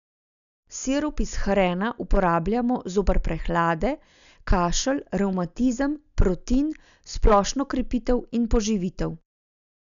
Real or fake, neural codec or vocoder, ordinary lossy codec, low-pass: real; none; none; 7.2 kHz